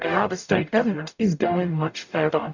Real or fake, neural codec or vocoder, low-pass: fake; codec, 44.1 kHz, 0.9 kbps, DAC; 7.2 kHz